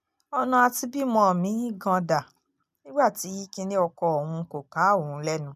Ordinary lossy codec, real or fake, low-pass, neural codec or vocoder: none; real; 14.4 kHz; none